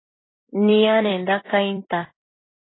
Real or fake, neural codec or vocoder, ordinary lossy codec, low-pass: fake; vocoder, 44.1 kHz, 128 mel bands every 256 samples, BigVGAN v2; AAC, 16 kbps; 7.2 kHz